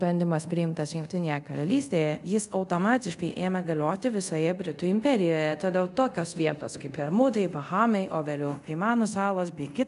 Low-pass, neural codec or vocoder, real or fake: 10.8 kHz; codec, 24 kHz, 0.5 kbps, DualCodec; fake